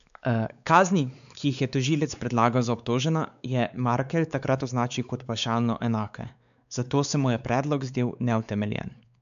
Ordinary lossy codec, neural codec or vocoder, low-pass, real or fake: none; codec, 16 kHz, 4 kbps, X-Codec, WavLM features, trained on Multilingual LibriSpeech; 7.2 kHz; fake